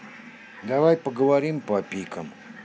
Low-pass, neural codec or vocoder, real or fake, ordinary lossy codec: none; none; real; none